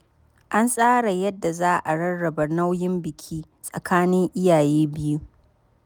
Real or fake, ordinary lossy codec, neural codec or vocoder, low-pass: real; none; none; none